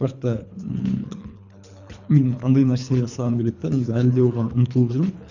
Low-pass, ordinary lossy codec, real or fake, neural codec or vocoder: 7.2 kHz; none; fake; codec, 24 kHz, 3 kbps, HILCodec